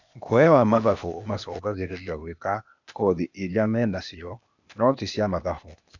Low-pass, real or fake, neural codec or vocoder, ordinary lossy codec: 7.2 kHz; fake; codec, 16 kHz, 0.8 kbps, ZipCodec; none